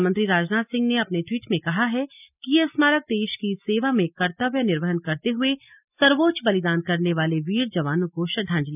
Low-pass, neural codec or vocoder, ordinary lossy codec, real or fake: 3.6 kHz; none; none; real